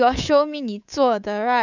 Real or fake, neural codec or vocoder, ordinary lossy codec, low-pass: fake; codec, 24 kHz, 3.1 kbps, DualCodec; none; 7.2 kHz